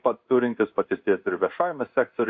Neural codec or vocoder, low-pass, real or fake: codec, 16 kHz in and 24 kHz out, 1 kbps, XY-Tokenizer; 7.2 kHz; fake